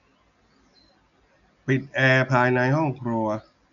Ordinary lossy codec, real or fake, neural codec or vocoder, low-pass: none; real; none; 7.2 kHz